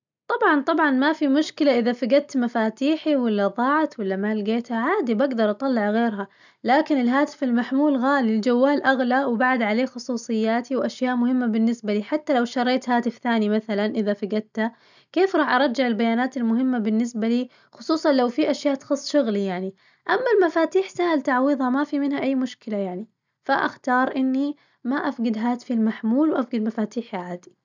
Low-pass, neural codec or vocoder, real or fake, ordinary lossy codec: 7.2 kHz; none; real; none